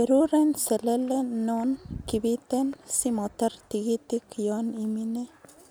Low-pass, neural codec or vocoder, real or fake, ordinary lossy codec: none; none; real; none